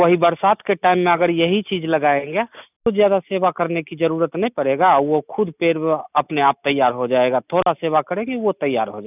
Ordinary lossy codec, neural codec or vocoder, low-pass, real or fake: none; none; 3.6 kHz; real